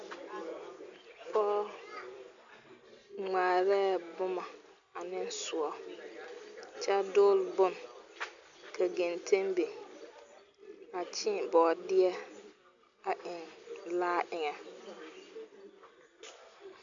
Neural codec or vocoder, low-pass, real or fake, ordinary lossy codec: none; 7.2 kHz; real; AAC, 64 kbps